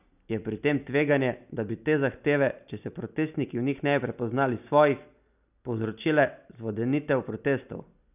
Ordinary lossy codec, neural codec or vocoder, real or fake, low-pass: none; none; real; 3.6 kHz